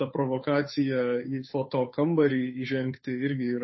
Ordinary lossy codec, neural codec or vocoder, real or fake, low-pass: MP3, 24 kbps; codec, 16 kHz, 2 kbps, FunCodec, trained on Chinese and English, 25 frames a second; fake; 7.2 kHz